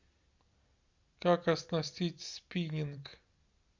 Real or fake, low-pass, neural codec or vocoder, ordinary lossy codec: real; 7.2 kHz; none; Opus, 64 kbps